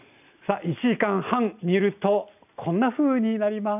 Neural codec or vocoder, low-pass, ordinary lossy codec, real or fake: none; 3.6 kHz; none; real